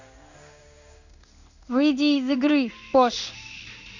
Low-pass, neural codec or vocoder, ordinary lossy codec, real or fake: 7.2 kHz; codec, 16 kHz in and 24 kHz out, 1 kbps, XY-Tokenizer; none; fake